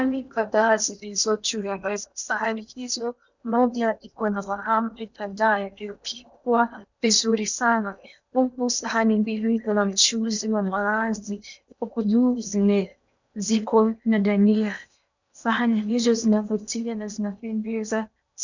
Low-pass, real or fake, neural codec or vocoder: 7.2 kHz; fake; codec, 16 kHz in and 24 kHz out, 0.8 kbps, FocalCodec, streaming, 65536 codes